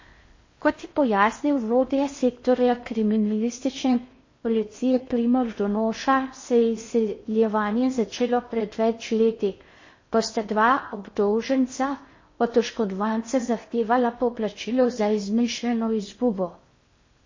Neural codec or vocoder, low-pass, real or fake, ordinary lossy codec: codec, 16 kHz in and 24 kHz out, 0.6 kbps, FocalCodec, streaming, 4096 codes; 7.2 kHz; fake; MP3, 32 kbps